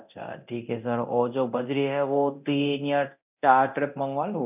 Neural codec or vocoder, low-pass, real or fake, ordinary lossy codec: codec, 24 kHz, 0.9 kbps, DualCodec; 3.6 kHz; fake; none